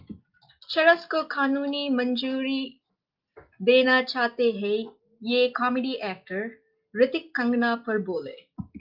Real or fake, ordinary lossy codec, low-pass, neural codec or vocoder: real; Opus, 32 kbps; 5.4 kHz; none